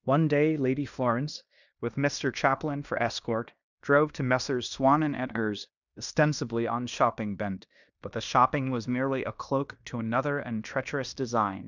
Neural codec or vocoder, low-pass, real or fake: codec, 16 kHz in and 24 kHz out, 0.9 kbps, LongCat-Audio-Codec, fine tuned four codebook decoder; 7.2 kHz; fake